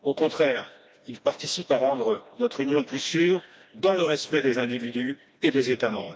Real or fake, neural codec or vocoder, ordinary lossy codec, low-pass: fake; codec, 16 kHz, 1 kbps, FreqCodec, smaller model; none; none